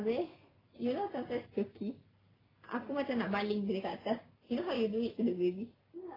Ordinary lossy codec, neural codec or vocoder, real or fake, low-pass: AAC, 24 kbps; none; real; 5.4 kHz